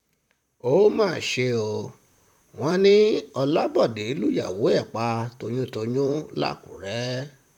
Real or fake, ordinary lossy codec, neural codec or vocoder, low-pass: fake; none; vocoder, 44.1 kHz, 128 mel bands, Pupu-Vocoder; 19.8 kHz